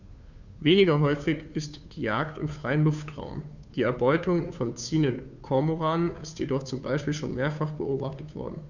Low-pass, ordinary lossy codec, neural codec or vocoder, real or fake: 7.2 kHz; none; codec, 16 kHz, 2 kbps, FunCodec, trained on Chinese and English, 25 frames a second; fake